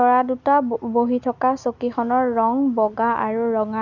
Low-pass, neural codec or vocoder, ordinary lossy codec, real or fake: 7.2 kHz; none; Opus, 64 kbps; real